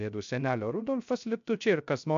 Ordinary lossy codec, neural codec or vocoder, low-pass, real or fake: MP3, 64 kbps; codec, 16 kHz, 0.3 kbps, FocalCodec; 7.2 kHz; fake